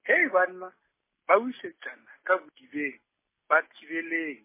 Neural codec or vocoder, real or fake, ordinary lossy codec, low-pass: none; real; MP3, 16 kbps; 3.6 kHz